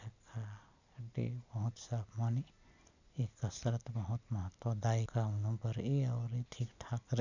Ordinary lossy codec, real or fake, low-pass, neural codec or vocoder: none; real; 7.2 kHz; none